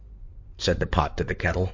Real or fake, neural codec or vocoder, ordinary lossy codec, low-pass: fake; codec, 16 kHz in and 24 kHz out, 2.2 kbps, FireRedTTS-2 codec; MP3, 48 kbps; 7.2 kHz